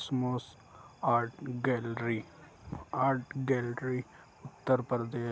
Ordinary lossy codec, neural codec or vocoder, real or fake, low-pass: none; none; real; none